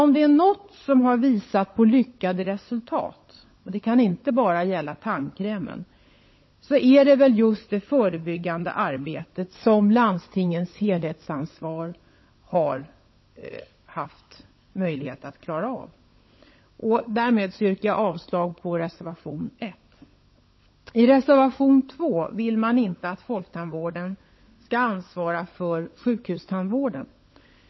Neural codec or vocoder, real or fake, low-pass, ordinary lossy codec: codec, 16 kHz, 16 kbps, FunCodec, trained on LibriTTS, 50 frames a second; fake; 7.2 kHz; MP3, 24 kbps